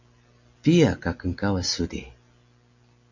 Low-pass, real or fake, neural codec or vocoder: 7.2 kHz; real; none